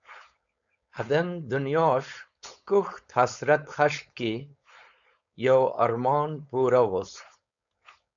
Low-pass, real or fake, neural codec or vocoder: 7.2 kHz; fake; codec, 16 kHz, 4.8 kbps, FACodec